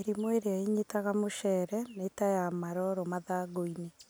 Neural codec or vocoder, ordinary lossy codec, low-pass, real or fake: none; none; none; real